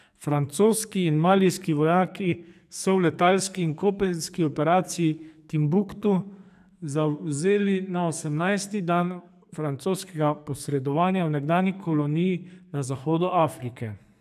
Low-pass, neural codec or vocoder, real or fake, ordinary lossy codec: 14.4 kHz; codec, 44.1 kHz, 2.6 kbps, SNAC; fake; none